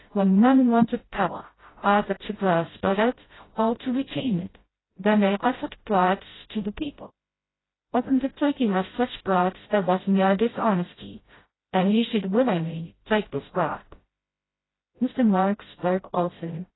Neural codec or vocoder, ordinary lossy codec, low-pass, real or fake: codec, 16 kHz, 0.5 kbps, FreqCodec, smaller model; AAC, 16 kbps; 7.2 kHz; fake